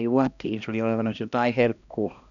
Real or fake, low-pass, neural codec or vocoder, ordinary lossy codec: fake; 7.2 kHz; codec, 16 kHz, 1 kbps, X-Codec, HuBERT features, trained on balanced general audio; none